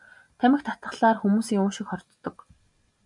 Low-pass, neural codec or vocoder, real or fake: 10.8 kHz; none; real